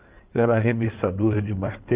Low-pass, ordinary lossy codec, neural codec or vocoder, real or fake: 3.6 kHz; Opus, 24 kbps; codec, 16 kHz in and 24 kHz out, 2.2 kbps, FireRedTTS-2 codec; fake